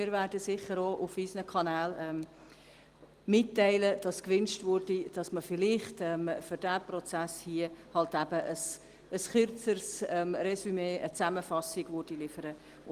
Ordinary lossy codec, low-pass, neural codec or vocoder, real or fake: Opus, 24 kbps; 14.4 kHz; none; real